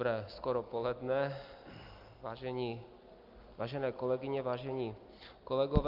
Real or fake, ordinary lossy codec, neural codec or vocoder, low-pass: real; Opus, 24 kbps; none; 5.4 kHz